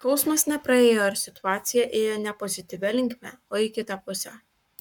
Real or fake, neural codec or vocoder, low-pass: fake; codec, 44.1 kHz, 7.8 kbps, Pupu-Codec; 19.8 kHz